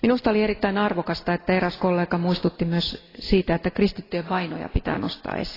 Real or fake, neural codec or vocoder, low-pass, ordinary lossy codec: real; none; 5.4 kHz; AAC, 24 kbps